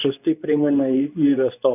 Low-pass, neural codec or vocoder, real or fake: 3.6 kHz; codec, 24 kHz, 0.9 kbps, WavTokenizer, medium speech release version 2; fake